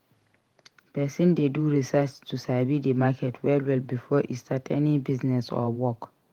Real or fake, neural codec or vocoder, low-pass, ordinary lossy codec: fake; vocoder, 48 kHz, 128 mel bands, Vocos; 19.8 kHz; Opus, 24 kbps